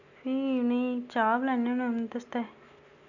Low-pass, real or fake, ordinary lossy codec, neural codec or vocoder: 7.2 kHz; real; none; none